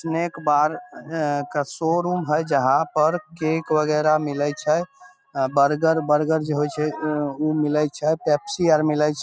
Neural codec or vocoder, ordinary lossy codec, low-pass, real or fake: none; none; none; real